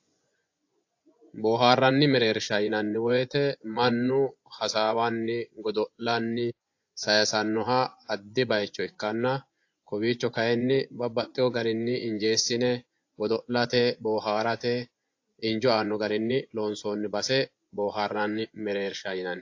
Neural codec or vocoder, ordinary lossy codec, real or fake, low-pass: vocoder, 24 kHz, 100 mel bands, Vocos; AAC, 48 kbps; fake; 7.2 kHz